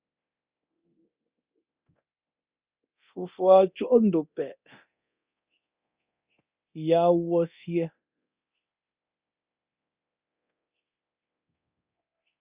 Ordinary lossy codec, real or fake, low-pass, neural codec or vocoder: Opus, 64 kbps; fake; 3.6 kHz; codec, 24 kHz, 0.9 kbps, DualCodec